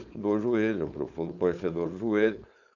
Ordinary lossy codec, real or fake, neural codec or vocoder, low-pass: none; fake; codec, 16 kHz, 4.8 kbps, FACodec; 7.2 kHz